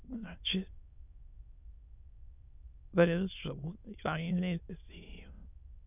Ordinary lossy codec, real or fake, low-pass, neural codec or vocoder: none; fake; 3.6 kHz; autoencoder, 22.05 kHz, a latent of 192 numbers a frame, VITS, trained on many speakers